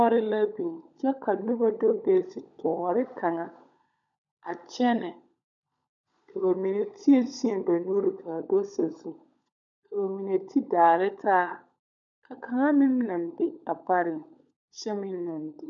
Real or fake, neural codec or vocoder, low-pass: fake; codec, 16 kHz, 16 kbps, FunCodec, trained on LibriTTS, 50 frames a second; 7.2 kHz